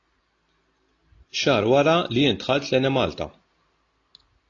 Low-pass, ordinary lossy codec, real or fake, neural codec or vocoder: 7.2 kHz; AAC, 32 kbps; real; none